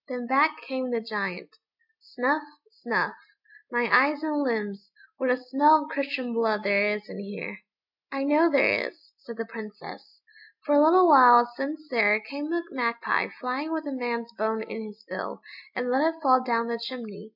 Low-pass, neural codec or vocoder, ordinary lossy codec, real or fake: 5.4 kHz; none; MP3, 48 kbps; real